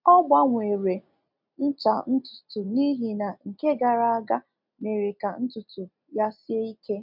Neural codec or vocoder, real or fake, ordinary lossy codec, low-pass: none; real; MP3, 48 kbps; 5.4 kHz